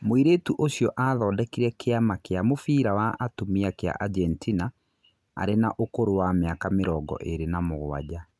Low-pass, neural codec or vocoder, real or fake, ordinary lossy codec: none; none; real; none